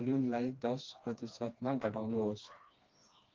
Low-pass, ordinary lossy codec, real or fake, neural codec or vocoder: 7.2 kHz; Opus, 32 kbps; fake; codec, 16 kHz, 2 kbps, FreqCodec, smaller model